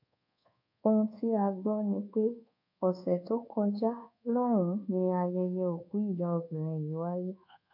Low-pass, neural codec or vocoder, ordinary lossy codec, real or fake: 5.4 kHz; codec, 24 kHz, 1.2 kbps, DualCodec; none; fake